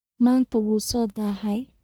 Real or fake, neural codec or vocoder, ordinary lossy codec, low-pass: fake; codec, 44.1 kHz, 1.7 kbps, Pupu-Codec; none; none